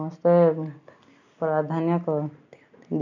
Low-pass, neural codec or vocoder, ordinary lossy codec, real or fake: 7.2 kHz; none; none; real